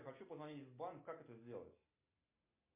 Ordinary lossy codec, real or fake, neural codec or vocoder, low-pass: MP3, 24 kbps; real; none; 3.6 kHz